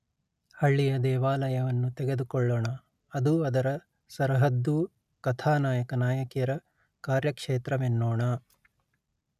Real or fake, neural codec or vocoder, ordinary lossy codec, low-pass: real; none; none; 14.4 kHz